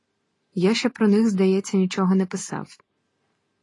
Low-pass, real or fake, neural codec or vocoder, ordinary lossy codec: 10.8 kHz; real; none; AAC, 32 kbps